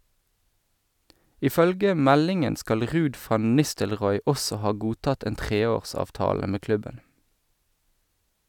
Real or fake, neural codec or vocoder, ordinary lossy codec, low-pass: real; none; none; 19.8 kHz